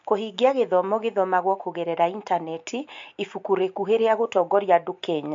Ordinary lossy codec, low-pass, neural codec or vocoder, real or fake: MP3, 48 kbps; 7.2 kHz; none; real